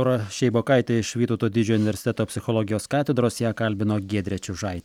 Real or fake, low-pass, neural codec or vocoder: real; 19.8 kHz; none